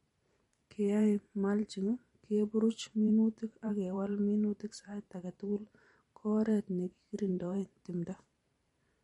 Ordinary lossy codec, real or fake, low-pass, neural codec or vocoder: MP3, 48 kbps; fake; 19.8 kHz; vocoder, 44.1 kHz, 128 mel bands every 512 samples, BigVGAN v2